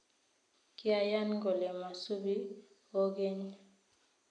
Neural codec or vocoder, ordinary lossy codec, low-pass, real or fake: none; none; 9.9 kHz; real